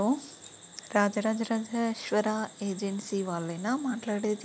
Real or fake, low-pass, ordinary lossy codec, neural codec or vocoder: real; none; none; none